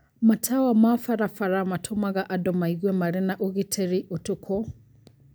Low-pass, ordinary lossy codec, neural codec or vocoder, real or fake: none; none; none; real